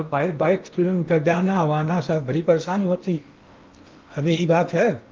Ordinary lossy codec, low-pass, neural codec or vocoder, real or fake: Opus, 24 kbps; 7.2 kHz; codec, 16 kHz in and 24 kHz out, 0.8 kbps, FocalCodec, streaming, 65536 codes; fake